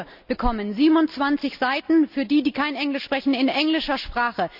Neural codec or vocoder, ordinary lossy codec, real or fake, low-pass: none; none; real; 5.4 kHz